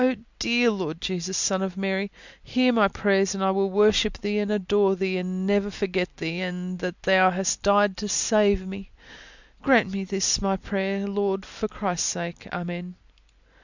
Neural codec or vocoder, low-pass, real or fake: none; 7.2 kHz; real